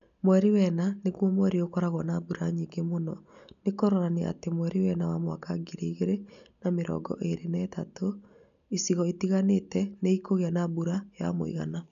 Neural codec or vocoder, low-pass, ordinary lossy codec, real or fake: none; 7.2 kHz; none; real